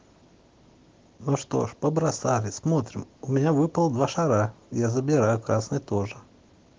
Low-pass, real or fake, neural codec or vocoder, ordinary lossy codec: 7.2 kHz; real; none; Opus, 16 kbps